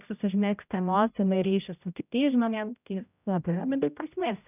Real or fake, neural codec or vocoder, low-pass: fake; codec, 16 kHz, 0.5 kbps, X-Codec, HuBERT features, trained on general audio; 3.6 kHz